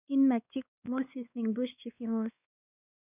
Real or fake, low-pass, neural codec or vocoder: fake; 3.6 kHz; codec, 16 kHz, 2 kbps, X-Codec, WavLM features, trained on Multilingual LibriSpeech